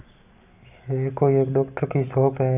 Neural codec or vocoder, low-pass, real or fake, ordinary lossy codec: none; 3.6 kHz; real; none